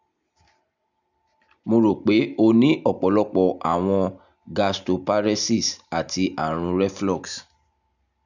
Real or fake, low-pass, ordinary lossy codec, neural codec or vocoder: real; 7.2 kHz; none; none